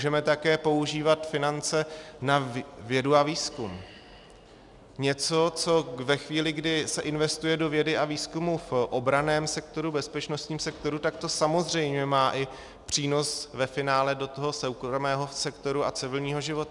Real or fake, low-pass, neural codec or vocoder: real; 10.8 kHz; none